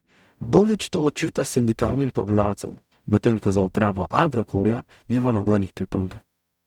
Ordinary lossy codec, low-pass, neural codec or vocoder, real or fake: none; 19.8 kHz; codec, 44.1 kHz, 0.9 kbps, DAC; fake